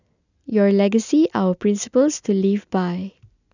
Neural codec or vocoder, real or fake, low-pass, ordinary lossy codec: none; real; 7.2 kHz; none